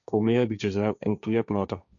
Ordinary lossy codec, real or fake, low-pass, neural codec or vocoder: none; fake; 7.2 kHz; codec, 16 kHz, 1.1 kbps, Voila-Tokenizer